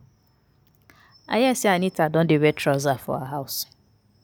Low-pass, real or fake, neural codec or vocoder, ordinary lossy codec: none; real; none; none